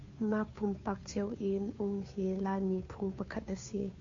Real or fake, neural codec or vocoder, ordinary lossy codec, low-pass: fake; codec, 16 kHz, 2 kbps, FunCodec, trained on Chinese and English, 25 frames a second; AAC, 48 kbps; 7.2 kHz